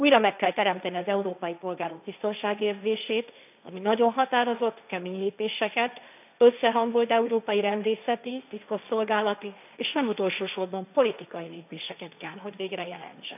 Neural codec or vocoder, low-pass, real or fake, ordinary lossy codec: codec, 16 kHz, 1.1 kbps, Voila-Tokenizer; 3.6 kHz; fake; none